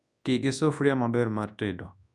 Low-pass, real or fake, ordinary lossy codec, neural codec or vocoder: none; fake; none; codec, 24 kHz, 0.9 kbps, WavTokenizer, large speech release